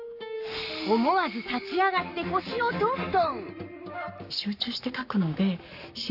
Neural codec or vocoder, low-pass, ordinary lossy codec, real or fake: codec, 44.1 kHz, 7.8 kbps, Pupu-Codec; 5.4 kHz; AAC, 48 kbps; fake